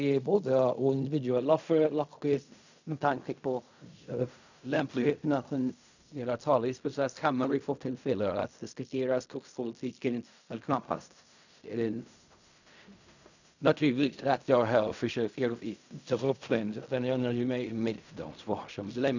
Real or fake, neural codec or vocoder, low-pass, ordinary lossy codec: fake; codec, 16 kHz in and 24 kHz out, 0.4 kbps, LongCat-Audio-Codec, fine tuned four codebook decoder; 7.2 kHz; none